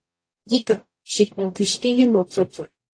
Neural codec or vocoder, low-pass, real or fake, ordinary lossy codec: codec, 44.1 kHz, 0.9 kbps, DAC; 9.9 kHz; fake; AAC, 48 kbps